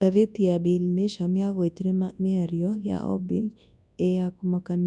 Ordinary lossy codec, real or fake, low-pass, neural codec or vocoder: none; fake; 10.8 kHz; codec, 24 kHz, 0.9 kbps, WavTokenizer, large speech release